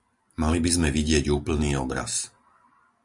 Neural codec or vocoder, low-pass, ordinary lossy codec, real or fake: none; 10.8 kHz; MP3, 96 kbps; real